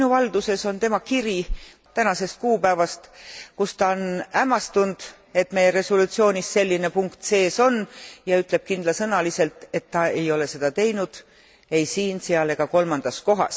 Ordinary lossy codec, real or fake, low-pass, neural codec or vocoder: none; real; none; none